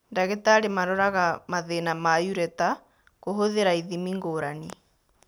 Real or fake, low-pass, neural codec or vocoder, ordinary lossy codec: real; none; none; none